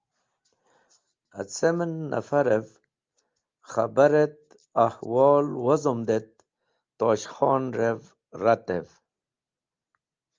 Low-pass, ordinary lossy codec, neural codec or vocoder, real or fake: 7.2 kHz; Opus, 24 kbps; none; real